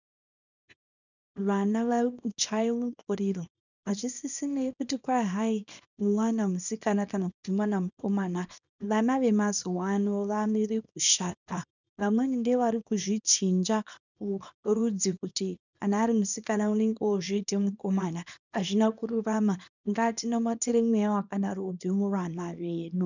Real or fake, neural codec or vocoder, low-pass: fake; codec, 24 kHz, 0.9 kbps, WavTokenizer, small release; 7.2 kHz